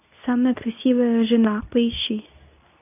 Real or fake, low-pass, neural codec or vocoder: fake; 3.6 kHz; codec, 24 kHz, 0.9 kbps, WavTokenizer, medium speech release version 2